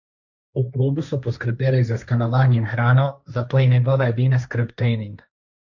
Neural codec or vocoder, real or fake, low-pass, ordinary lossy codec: codec, 16 kHz, 1.1 kbps, Voila-Tokenizer; fake; 7.2 kHz; none